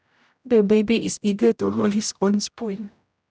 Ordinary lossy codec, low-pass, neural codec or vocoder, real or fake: none; none; codec, 16 kHz, 0.5 kbps, X-Codec, HuBERT features, trained on general audio; fake